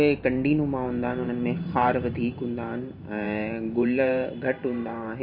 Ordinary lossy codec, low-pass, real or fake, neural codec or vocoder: MP3, 32 kbps; 5.4 kHz; fake; vocoder, 44.1 kHz, 128 mel bands every 512 samples, BigVGAN v2